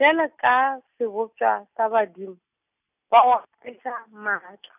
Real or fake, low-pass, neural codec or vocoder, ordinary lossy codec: real; 3.6 kHz; none; none